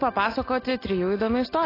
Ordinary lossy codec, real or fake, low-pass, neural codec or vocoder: AAC, 24 kbps; real; 5.4 kHz; none